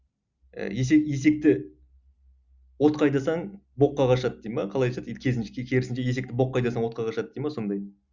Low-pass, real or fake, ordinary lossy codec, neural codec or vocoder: 7.2 kHz; real; Opus, 64 kbps; none